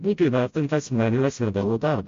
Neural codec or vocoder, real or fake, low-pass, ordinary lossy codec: codec, 16 kHz, 0.5 kbps, FreqCodec, smaller model; fake; 7.2 kHz; MP3, 48 kbps